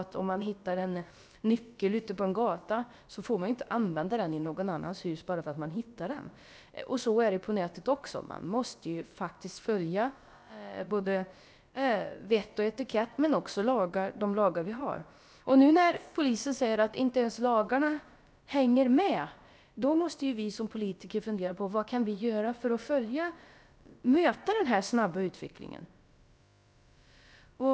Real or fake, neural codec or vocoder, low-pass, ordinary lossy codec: fake; codec, 16 kHz, about 1 kbps, DyCAST, with the encoder's durations; none; none